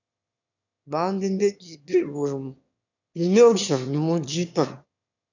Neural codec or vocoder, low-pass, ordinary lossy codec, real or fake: autoencoder, 22.05 kHz, a latent of 192 numbers a frame, VITS, trained on one speaker; 7.2 kHz; none; fake